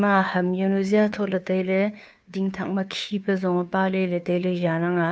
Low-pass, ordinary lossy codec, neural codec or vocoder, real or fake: none; none; codec, 16 kHz, 2 kbps, FunCodec, trained on Chinese and English, 25 frames a second; fake